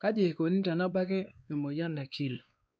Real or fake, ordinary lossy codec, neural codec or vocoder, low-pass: fake; none; codec, 16 kHz, 2 kbps, X-Codec, WavLM features, trained on Multilingual LibriSpeech; none